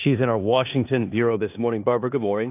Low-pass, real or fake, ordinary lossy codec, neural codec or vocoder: 3.6 kHz; fake; AAC, 32 kbps; codec, 16 kHz in and 24 kHz out, 0.9 kbps, LongCat-Audio-Codec, four codebook decoder